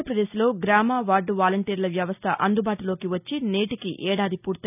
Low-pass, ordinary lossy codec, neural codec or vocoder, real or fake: 3.6 kHz; none; none; real